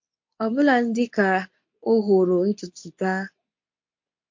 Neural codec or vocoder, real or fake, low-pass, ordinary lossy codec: codec, 24 kHz, 0.9 kbps, WavTokenizer, medium speech release version 2; fake; 7.2 kHz; MP3, 48 kbps